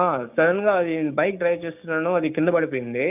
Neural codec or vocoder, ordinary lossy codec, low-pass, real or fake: codec, 16 kHz, 6 kbps, DAC; none; 3.6 kHz; fake